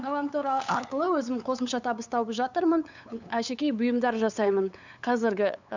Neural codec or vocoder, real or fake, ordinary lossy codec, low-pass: codec, 16 kHz, 8 kbps, FunCodec, trained on LibriTTS, 25 frames a second; fake; none; 7.2 kHz